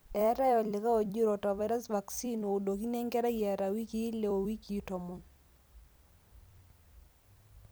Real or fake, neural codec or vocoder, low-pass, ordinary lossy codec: fake; vocoder, 44.1 kHz, 128 mel bands every 256 samples, BigVGAN v2; none; none